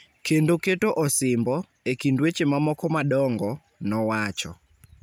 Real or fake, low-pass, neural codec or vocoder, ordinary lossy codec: real; none; none; none